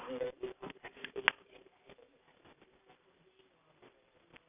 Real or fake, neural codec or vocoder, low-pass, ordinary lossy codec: real; none; 3.6 kHz; none